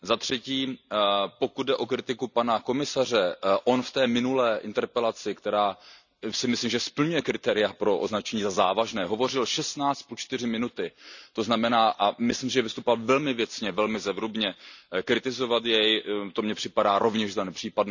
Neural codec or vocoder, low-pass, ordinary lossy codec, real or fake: none; 7.2 kHz; none; real